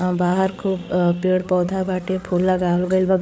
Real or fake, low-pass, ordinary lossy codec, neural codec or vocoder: fake; none; none; codec, 16 kHz, 8 kbps, FreqCodec, larger model